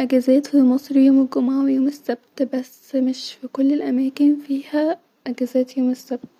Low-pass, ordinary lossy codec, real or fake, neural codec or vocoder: 19.8 kHz; none; real; none